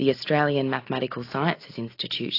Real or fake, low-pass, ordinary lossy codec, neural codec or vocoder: real; 5.4 kHz; AAC, 32 kbps; none